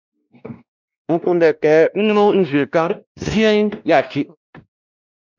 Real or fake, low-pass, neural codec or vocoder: fake; 7.2 kHz; codec, 16 kHz, 1 kbps, X-Codec, WavLM features, trained on Multilingual LibriSpeech